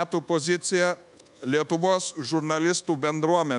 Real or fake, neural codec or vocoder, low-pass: fake; codec, 24 kHz, 1.2 kbps, DualCodec; 10.8 kHz